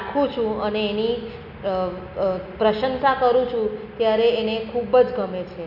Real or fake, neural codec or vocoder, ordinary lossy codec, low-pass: real; none; none; 5.4 kHz